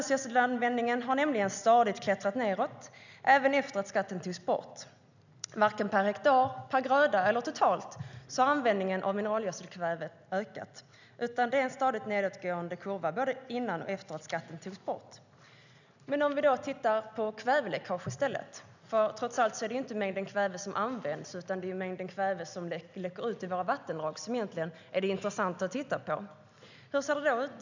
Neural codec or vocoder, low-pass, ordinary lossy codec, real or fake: none; 7.2 kHz; none; real